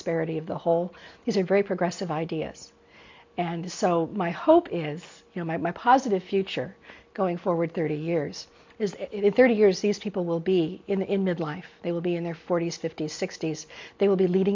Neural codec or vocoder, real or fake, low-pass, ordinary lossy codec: none; real; 7.2 kHz; MP3, 48 kbps